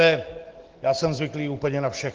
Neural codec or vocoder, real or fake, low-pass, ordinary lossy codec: none; real; 7.2 kHz; Opus, 16 kbps